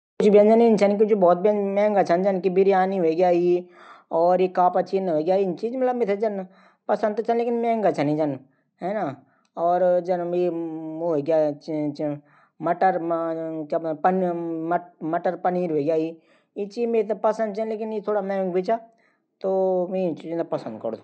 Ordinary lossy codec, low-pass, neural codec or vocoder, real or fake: none; none; none; real